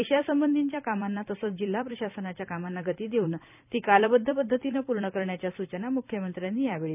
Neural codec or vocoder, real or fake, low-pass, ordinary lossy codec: vocoder, 44.1 kHz, 128 mel bands every 256 samples, BigVGAN v2; fake; 3.6 kHz; none